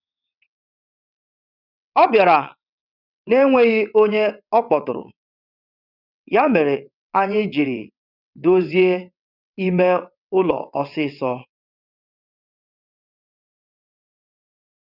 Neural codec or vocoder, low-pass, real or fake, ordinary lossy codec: vocoder, 22.05 kHz, 80 mel bands, WaveNeXt; 5.4 kHz; fake; none